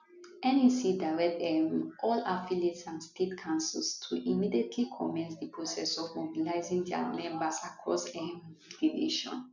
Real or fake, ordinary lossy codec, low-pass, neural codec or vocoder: real; none; 7.2 kHz; none